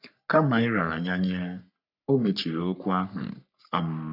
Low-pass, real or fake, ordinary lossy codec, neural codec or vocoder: 5.4 kHz; fake; none; codec, 44.1 kHz, 3.4 kbps, Pupu-Codec